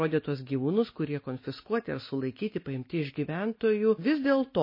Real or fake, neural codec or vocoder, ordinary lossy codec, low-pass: real; none; MP3, 24 kbps; 5.4 kHz